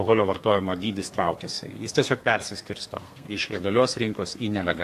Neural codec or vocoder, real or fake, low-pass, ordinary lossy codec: codec, 32 kHz, 1.9 kbps, SNAC; fake; 14.4 kHz; AAC, 64 kbps